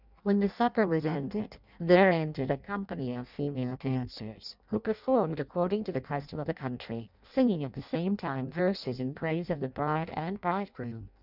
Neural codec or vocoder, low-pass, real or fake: codec, 16 kHz in and 24 kHz out, 0.6 kbps, FireRedTTS-2 codec; 5.4 kHz; fake